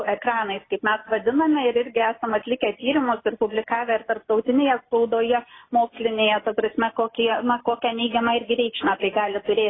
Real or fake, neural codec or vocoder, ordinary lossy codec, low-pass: real; none; AAC, 16 kbps; 7.2 kHz